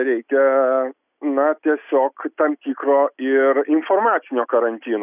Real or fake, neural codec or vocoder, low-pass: real; none; 3.6 kHz